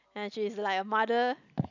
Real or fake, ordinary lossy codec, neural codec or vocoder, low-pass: real; none; none; 7.2 kHz